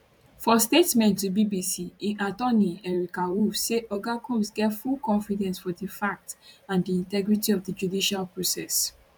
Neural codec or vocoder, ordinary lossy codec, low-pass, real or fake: vocoder, 44.1 kHz, 128 mel bands every 512 samples, BigVGAN v2; none; 19.8 kHz; fake